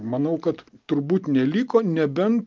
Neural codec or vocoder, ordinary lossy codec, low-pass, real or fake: none; Opus, 24 kbps; 7.2 kHz; real